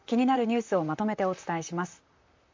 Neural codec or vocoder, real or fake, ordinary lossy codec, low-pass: vocoder, 44.1 kHz, 128 mel bands, Pupu-Vocoder; fake; MP3, 48 kbps; 7.2 kHz